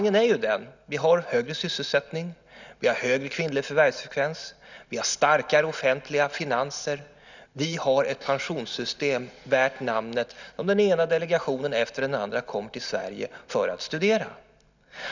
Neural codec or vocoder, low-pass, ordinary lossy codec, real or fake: none; 7.2 kHz; none; real